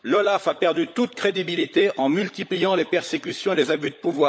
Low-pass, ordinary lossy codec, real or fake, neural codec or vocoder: none; none; fake; codec, 16 kHz, 16 kbps, FunCodec, trained on LibriTTS, 50 frames a second